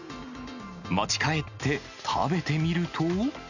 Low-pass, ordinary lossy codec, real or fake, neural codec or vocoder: 7.2 kHz; none; real; none